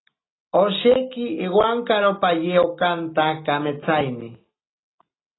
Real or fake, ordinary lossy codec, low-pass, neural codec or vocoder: real; AAC, 16 kbps; 7.2 kHz; none